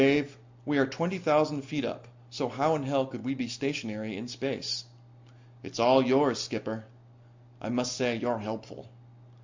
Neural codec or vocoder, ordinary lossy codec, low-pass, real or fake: none; MP3, 64 kbps; 7.2 kHz; real